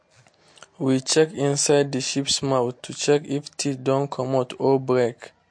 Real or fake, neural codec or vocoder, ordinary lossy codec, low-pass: real; none; MP3, 48 kbps; 9.9 kHz